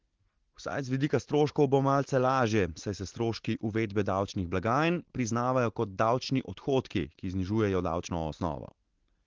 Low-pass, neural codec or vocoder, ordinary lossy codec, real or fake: 7.2 kHz; none; Opus, 16 kbps; real